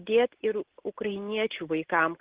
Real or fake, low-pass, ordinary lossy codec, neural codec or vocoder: real; 3.6 kHz; Opus, 16 kbps; none